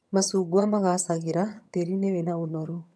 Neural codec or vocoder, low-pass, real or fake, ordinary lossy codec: vocoder, 22.05 kHz, 80 mel bands, HiFi-GAN; none; fake; none